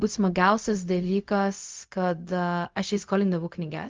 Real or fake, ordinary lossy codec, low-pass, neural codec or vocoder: fake; Opus, 32 kbps; 7.2 kHz; codec, 16 kHz, 0.4 kbps, LongCat-Audio-Codec